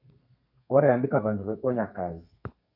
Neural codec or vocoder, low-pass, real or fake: codec, 32 kHz, 1.9 kbps, SNAC; 5.4 kHz; fake